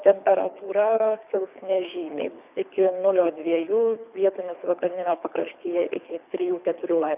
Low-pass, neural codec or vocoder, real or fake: 3.6 kHz; codec, 24 kHz, 3 kbps, HILCodec; fake